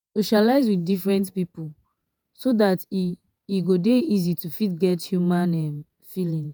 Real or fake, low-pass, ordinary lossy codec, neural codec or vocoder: fake; none; none; vocoder, 48 kHz, 128 mel bands, Vocos